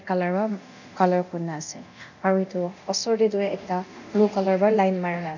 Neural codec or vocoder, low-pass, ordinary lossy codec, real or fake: codec, 24 kHz, 0.9 kbps, DualCodec; 7.2 kHz; none; fake